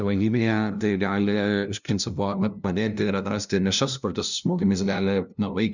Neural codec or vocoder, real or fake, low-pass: codec, 16 kHz, 0.5 kbps, FunCodec, trained on LibriTTS, 25 frames a second; fake; 7.2 kHz